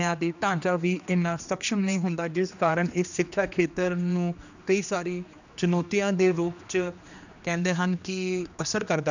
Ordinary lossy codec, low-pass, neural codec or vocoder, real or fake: none; 7.2 kHz; codec, 16 kHz, 2 kbps, X-Codec, HuBERT features, trained on general audio; fake